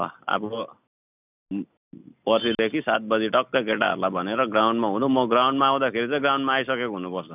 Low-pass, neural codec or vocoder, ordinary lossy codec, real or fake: 3.6 kHz; none; none; real